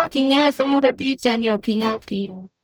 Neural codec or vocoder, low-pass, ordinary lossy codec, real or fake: codec, 44.1 kHz, 0.9 kbps, DAC; none; none; fake